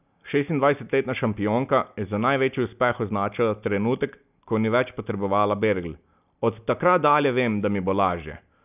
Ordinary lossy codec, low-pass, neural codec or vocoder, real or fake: none; 3.6 kHz; none; real